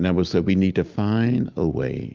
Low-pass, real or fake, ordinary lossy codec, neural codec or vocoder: 7.2 kHz; real; Opus, 32 kbps; none